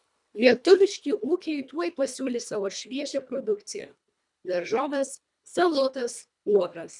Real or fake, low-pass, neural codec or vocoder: fake; 10.8 kHz; codec, 24 kHz, 1.5 kbps, HILCodec